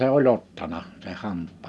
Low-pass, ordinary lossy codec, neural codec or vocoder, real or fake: none; none; none; real